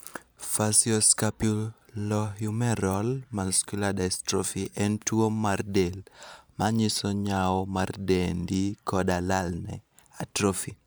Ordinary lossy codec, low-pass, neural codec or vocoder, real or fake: none; none; vocoder, 44.1 kHz, 128 mel bands every 512 samples, BigVGAN v2; fake